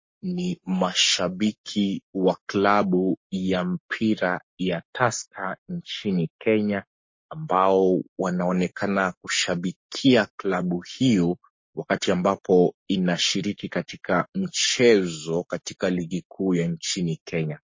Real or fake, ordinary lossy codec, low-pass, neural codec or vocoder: fake; MP3, 32 kbps; 7.2 kHz; codec, 44.1 kHz, 7.8 kbps, Pupu-Codec